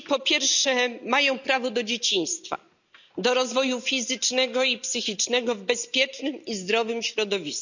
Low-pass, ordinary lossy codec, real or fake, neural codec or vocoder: 7.2 kHz; none; real; none